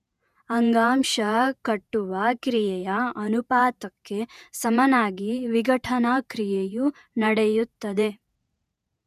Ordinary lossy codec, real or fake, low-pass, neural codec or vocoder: none; fake; 14.4 kHz; vocoder, 48 kHz, 128 mel bands, Vocos